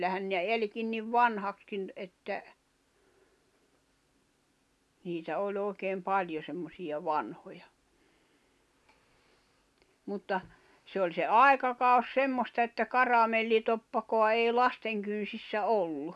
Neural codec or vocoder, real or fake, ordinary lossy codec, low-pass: none; real; none; none